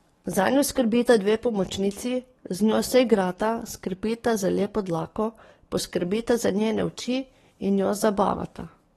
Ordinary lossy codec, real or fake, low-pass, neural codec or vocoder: AAC, 32 kbps; fake; 19.8 kHz; codec, 44.1 kHz, 7.8 kbps, DAC